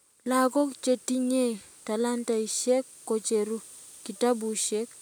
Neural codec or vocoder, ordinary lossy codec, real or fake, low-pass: none; none; real; none